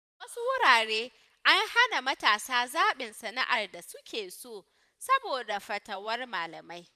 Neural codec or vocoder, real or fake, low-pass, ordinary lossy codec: vocoder, 44.1 kHz, 128 mel bands every 512 samples, BigVGAN v2; fake; 14.4 kHz; none